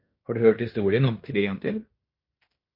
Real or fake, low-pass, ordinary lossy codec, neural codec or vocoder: fake; 5.4 kHz; MP3, 32 kbps; codec, 16 kHz in and 24 kHz out, 0.9 kbps, LongCat-Audio-Codec, fine tuned four codebook decoder